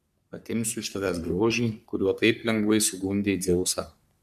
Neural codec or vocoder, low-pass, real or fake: codec, 44.1 kHz, 3.4 kbps, Pupu-Codec; 14.4 kHz; fake